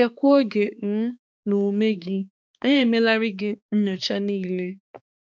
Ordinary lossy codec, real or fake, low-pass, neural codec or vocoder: none; fake; none; codec, 16 kHz, 2 kbps, X-Codec, HuBERT features, trained on balanced general audio